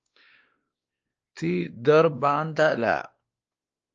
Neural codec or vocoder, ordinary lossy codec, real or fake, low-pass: codec, 16 kHz, 2 kbps, X-Codec, WavLM features, trained on Multilingual LibriSpeech; Opus, 24 kbps; fake; 7.2 kHz